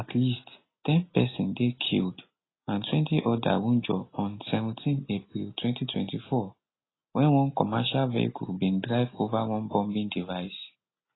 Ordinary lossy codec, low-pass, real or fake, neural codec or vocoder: AAC, 16 kbps; 7.2 kHz; real; none